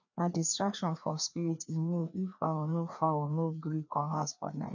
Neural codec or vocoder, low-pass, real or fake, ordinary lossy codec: codec, 16 kHz, 2 kbps, FreqCodec, larger model; 7.2 kHz; fake; none